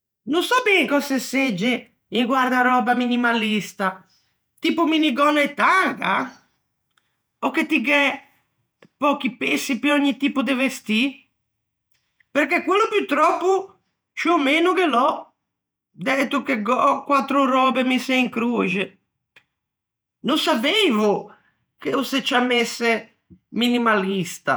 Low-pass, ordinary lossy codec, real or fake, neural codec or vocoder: none; none; fake; vocoder, 48 kHz, 128 mel bands, Vocos